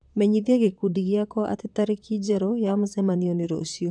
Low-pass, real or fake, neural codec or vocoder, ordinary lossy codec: 9.9 kHz; fake; vocoder, 44.1 kHz, 128 mel bands, Pupu-Vocoder; none